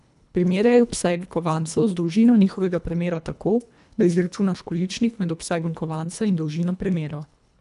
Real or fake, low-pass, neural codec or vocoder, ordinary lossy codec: fake; 10.8 kHz; codec, 24 kHz, 1.5 kbps, HILCodec; none